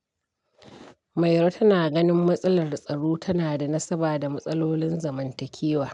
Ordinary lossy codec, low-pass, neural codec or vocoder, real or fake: none; 10.8 kHz; none; real